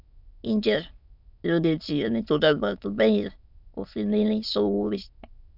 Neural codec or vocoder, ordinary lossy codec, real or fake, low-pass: autoencoder, 22.05 kHz, a latent of 192 numbers a frame, VITS, trained on many speakers; none; fake; 5.4 kHz